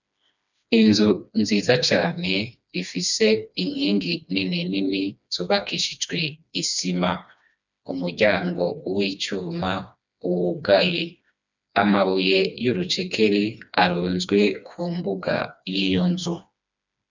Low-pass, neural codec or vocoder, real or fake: 7.2 kHz; codec, 16 kHz, 2 kbps, FreqCodec, smaller model; fake